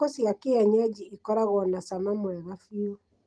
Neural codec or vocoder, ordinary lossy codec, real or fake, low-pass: none; Opus, 24 kbps; real; 9.9 kHz